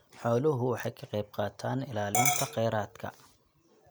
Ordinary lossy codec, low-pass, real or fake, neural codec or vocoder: none; none; real; none